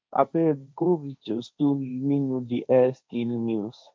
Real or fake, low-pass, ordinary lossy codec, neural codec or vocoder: fake; none; none; codec, 16 kHz, 1.1 kbps, Voila-Tokenizer